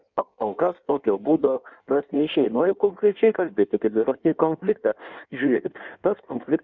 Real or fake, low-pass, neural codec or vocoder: fake; 7.2 kHz; codec, 16 kHz in and 24 kHz out, 1.1 kbps, FireRedTTS-2 codec